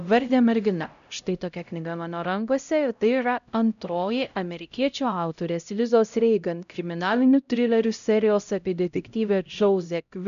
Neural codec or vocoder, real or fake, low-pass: codec, 16 kHz, 0.5 kbps, X-Codec, HuBERT features, trained on LibriSpeech; fake; 7.2 kHz